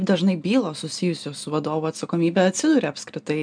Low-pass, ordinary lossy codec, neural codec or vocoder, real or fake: 9.9 kHz; MP3, 96 kbps; none; real